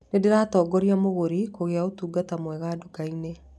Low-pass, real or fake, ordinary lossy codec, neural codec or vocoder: none; real; none; none